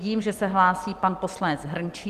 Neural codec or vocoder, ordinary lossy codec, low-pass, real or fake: vocoder, 44.1 kHz, 128 mel bands every 256 samples, BigVGAN v2; Opus, 24 kbps; 14.4 kHz; fake